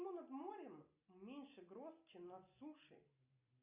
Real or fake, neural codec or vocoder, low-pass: real; none; 3.6 kHz